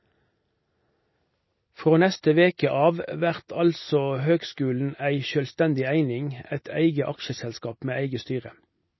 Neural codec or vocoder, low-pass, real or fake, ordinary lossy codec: none; 7.2 kHz; real; MP3, 24 kbps